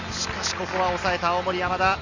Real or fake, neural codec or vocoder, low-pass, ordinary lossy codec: real; none; 7.2 kHz; none